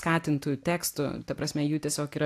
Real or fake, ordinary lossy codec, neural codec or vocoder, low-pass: real; AAC, 64 kbps; none; 14.4 kHz